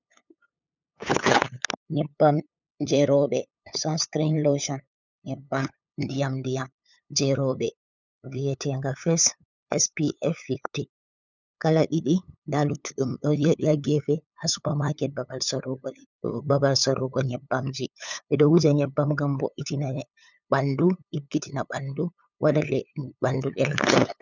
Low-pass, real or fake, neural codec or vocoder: 7.2 kHz; fake; codec, 16 kHz, 8 kbps, FunCodec, trained on LibriTTS, 25 frames a second